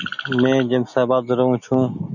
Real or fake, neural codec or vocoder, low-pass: real; none; 7.2 kHz